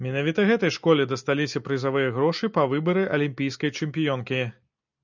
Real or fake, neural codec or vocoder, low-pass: real; none; 7.2 kHz